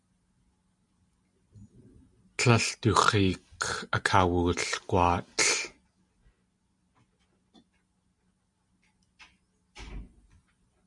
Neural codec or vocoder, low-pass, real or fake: none; 10.8 kHz; real